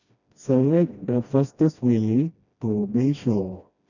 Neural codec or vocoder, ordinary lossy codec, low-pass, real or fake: codec, 16 kHz, 1 kbps, FreqCodec, smaller model; none; 7.2 kHz; fake